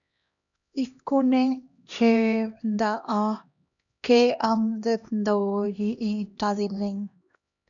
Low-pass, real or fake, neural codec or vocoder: 7.2 kHz; fake; codec, 16 kHz, 1 kbps, X-Codec, HuBERT features, trained on LibriSpeech